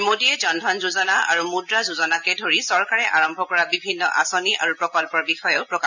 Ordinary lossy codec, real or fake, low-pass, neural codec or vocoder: none; real; 7.2 kHz; none